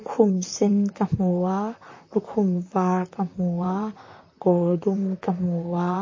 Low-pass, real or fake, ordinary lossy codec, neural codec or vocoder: 7.2 kHz; fake; MP3, 32 kbps; vocoder, 44.1 kHz, 128 mel bands, Pupu-Vocoder